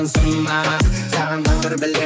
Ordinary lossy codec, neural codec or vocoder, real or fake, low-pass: none; codec, 16 kHz, 4 kbps, X-Codec, HuBERT features, trained on balanced general audio; fake; none